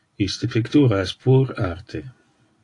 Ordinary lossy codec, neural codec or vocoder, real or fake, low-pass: AAC, 48 kbps; none; real; 10.8 kHz